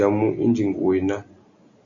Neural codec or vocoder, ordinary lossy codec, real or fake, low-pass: none; AAC, 64 kbps; real; 7.2 kHz